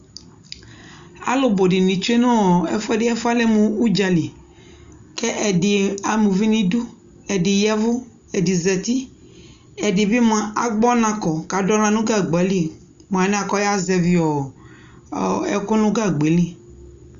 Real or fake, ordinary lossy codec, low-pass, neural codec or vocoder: real; Opus, 64 kbps; 7.2 kHz; none